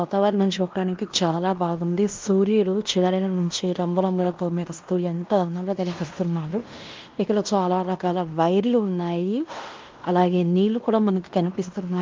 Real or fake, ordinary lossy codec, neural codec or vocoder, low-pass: fake; Opus, 32 kbps; codec, 16 kHz in and 24 kHz out, 0.9 kbps, LongCat-Audio-Codec, fine tuned four codebook decoder; 7.2 kHz